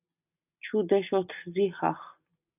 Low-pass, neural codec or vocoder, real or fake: 3.6 kHz; none; real